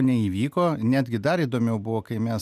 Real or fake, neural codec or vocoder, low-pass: real; none; 14.4 kHz